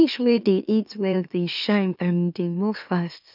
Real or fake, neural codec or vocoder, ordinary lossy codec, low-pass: fake; autoencoder, 44.1 kHz, a latent of 192 numbers a frame, MeloTTS; none; 5.4 kHz